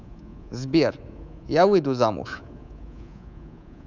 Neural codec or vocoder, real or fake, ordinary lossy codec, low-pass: codec, 24 kHz, 3.1 kbps, DualCodec; fake; none; 7.2 kHz